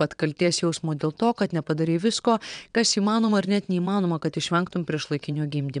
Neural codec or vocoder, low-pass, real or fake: vocoder, 22.05 kHz, 80 mel bands, WaveNeXt; 9.9 kHz; fake